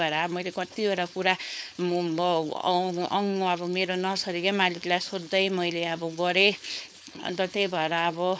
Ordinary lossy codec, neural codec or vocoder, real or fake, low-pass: none; codec, 16 kHz, 4.8 kbps, FACodec; fake; none